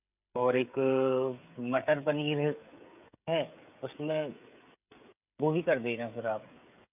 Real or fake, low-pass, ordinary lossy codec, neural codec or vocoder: fake; 3.6 kHz; none; codec, 16 kHz, 16 kbps, FreqCodec, smaller model